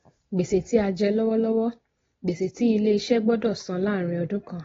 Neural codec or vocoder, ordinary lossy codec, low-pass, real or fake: none; AAC, 24 kbps; 7.2 kHz; real